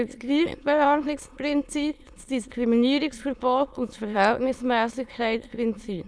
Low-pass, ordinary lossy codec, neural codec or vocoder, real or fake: none; none; autoencoder, 22.05 kHz, a latent of 192 numbers a frame, VITS, trained on many speakers; fake